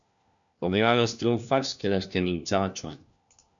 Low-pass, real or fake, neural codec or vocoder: 7.2 kHz; fake; codec, 16 kHz, 1 kbps, FunCodec, trained on LibriTTS, 50 frames a second